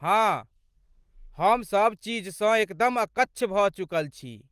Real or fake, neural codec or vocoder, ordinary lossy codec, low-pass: real; none; Opus, 24 kbps; 14.4 kHz